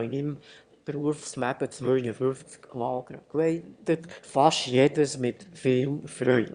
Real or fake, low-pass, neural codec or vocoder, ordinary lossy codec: fake; 9.9 kHz; autoencoder, 22.05 kHz, a latent of 192 numbers a frame, VITS, trained on one speaker; Opus, 64 kbps